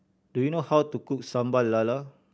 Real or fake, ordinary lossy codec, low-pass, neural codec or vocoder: real; none; none; none